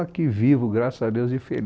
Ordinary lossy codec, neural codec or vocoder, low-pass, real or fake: none; none; none; real